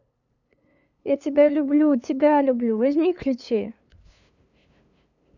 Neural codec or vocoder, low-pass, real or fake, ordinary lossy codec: codec, 16 kHz, 2 kbps, FunCodec, trained on LibriTTS, 25 frames a second; 7.2 kHz; fake; none